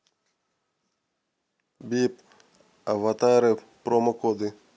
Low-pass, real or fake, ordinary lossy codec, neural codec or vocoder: none; real; none; none